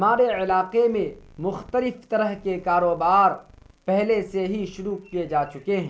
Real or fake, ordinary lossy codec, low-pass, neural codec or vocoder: real; none; none; none